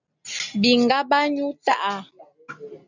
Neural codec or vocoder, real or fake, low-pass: none; real; 7.2 kHz